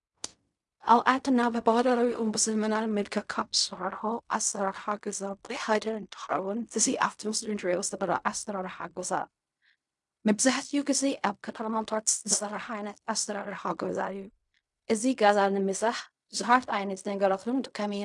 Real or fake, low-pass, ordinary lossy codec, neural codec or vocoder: fake; 10.8 kHz; MP3, 96 kbps; codec, 16 kHz in and 24 kHz out, 0.4 kbps, LongCat-Audio-Codec, fine tuned four codebook decoder